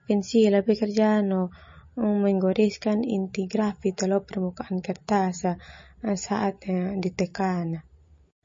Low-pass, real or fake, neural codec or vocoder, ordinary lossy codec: 7.2 kHz; real; none; MP3, 32 kbps